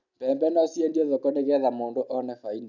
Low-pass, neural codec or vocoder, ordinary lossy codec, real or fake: 7.2 kHz; none; none; real